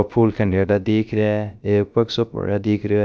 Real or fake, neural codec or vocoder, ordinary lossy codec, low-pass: fake; codec, 16 kHz, 0.3 kbps, FocalCodec; none; none